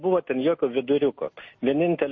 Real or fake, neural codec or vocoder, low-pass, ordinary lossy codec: real; none; 7.2 kHz; MP3, 32 kbps